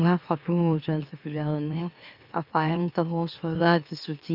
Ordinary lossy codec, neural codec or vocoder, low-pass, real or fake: none; autoencoder, 44.1 kHz, a latent of 192 numbers a frame, MeloTTS; 5.4 kHz; fake